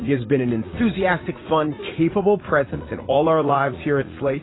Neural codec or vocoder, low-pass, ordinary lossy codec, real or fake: vocoder, 44.1 kHz, 80 mel bands, Vocos; 7.2 kHz; AAC, 16 kbps; fake